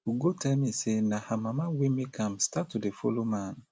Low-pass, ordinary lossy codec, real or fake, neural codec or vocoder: none; none; real; none